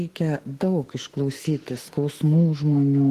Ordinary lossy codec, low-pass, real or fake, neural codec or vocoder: Opus, 16 kbps; 14.4 kHz; fake; autoencoder, 48 kHz, 32 numbers a frame, DAC-VAE, trained on Japanese speech